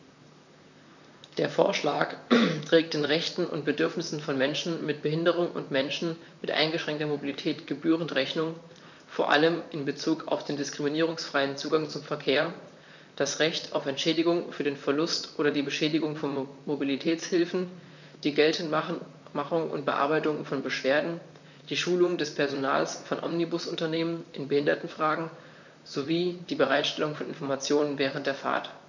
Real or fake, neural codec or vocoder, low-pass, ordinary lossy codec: fake; vocoder, 44.1 kHz, 128 mel bands, Pupu-Vocoder; 7.2 kHz; none